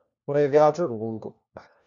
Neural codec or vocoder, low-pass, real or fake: codec, 16 kHz, 1 kbps, FunCodec, trained on LibriTTS, 50 frames a second; 7.2 kHz; fake